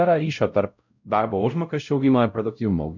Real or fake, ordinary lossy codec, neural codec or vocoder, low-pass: fake; MP3, 48 kbps; codec, 16 kHz, 0.5 kbps, X-Codec, WavLM features, trained on Multilingual LibriSpeech; 7.2 kHz